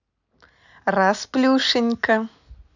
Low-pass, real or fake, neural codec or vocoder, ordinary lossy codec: 7.2 kHz; real; none; none